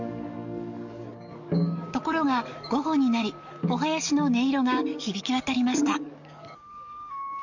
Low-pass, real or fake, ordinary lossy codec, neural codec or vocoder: 7.2 kHz; fake; none; codec, 44.1 kHz, 7.8 kbps, DAC